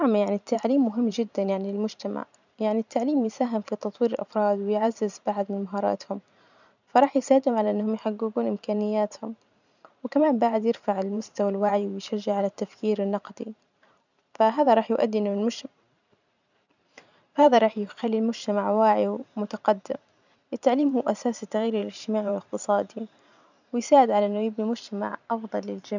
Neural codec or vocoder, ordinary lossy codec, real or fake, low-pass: vocoder, 44.1 kHz, 128 mel bands every 512 samples, BigVGAN v2; none; fake; 7.2 kHz